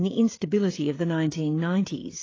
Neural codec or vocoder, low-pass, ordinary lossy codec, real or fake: codec, 16 kHz, 16 kbps, FreqCodec, smaller model; 7.2 kHz; AAC, 32 kbps; fake